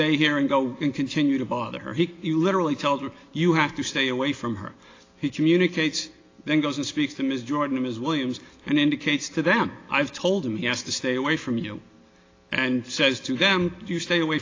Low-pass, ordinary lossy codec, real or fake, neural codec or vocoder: 7.2 kHz; AAC, 32 kbps; real; none